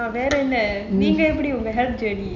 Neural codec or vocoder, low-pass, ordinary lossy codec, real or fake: none; 7.2 kHz; none; real